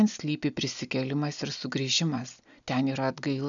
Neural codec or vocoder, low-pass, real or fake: none; 7.2 kHz; real